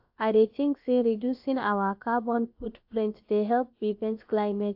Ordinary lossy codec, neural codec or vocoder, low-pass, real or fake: AAC, 48 kbps; codec, 16 kHz, about 1 kbps, DyCAST, with the encoder's durations; 5.4 kHz; fake